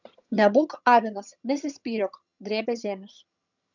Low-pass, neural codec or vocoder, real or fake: 7.2 kHz; vocoder, 22.05 kHz, 80 mel bands, HiFi-GAN; fake